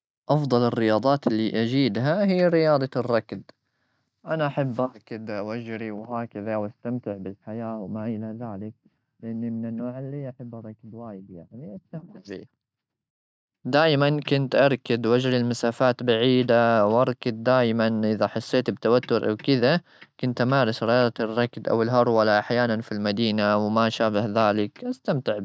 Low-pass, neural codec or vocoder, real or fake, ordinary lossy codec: none; none; real; none